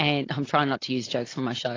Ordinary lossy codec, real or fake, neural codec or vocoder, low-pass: AAC, 32 kbps; real; none; 7.2 kHz